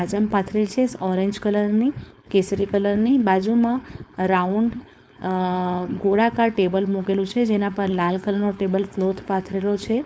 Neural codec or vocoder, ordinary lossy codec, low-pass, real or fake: codec, 16 kHz, 4.8 kbps, FACodec; none; none; fake